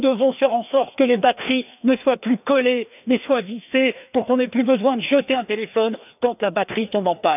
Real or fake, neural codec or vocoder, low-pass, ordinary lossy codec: fake; codec, 16 kHz, 2 kbps, FreqCodec, larger model; 3.6 kHz; none